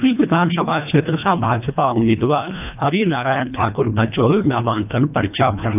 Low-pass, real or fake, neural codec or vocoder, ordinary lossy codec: 3.6 kHz; fake; codec, 24 kHz, 1.5 kbps, HILCodec; none